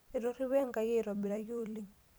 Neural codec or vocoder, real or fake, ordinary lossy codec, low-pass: vocoder, 44.1 kHz, 128 mel bands every 256 samples, BigVGAN v2; fake; none; none